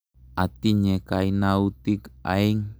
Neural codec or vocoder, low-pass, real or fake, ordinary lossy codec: none; none; real; none